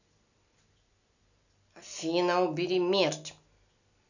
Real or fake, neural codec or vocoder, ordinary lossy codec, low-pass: real; none; none; 7.2 kHz